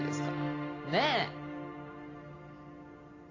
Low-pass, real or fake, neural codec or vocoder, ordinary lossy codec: 7.2 kHz; real; none; AAC, 32 kbps